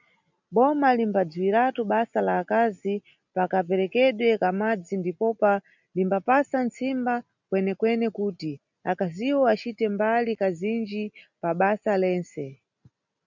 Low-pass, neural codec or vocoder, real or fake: 7.2 kHz; none; real